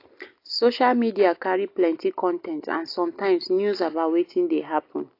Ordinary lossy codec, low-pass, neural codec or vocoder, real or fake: AAC, 32 kbps; 5.4 kHz; none; real